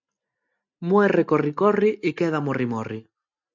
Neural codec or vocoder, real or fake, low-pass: none; real; 7.2 kHz